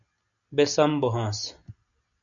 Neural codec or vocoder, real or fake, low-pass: none; real; 7.2 kHz